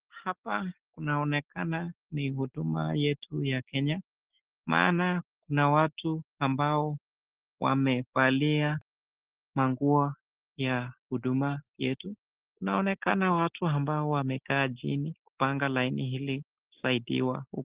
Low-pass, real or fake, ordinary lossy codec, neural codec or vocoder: 3.6 kHz; real; Opus, 16 kbps; none